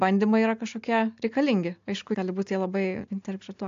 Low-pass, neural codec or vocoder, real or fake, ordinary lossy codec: 7.2 kHz; none; real; AAC, 64 kbps